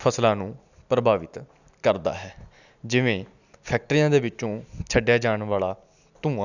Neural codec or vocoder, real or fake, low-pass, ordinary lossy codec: none; real; 7.2 kHz; none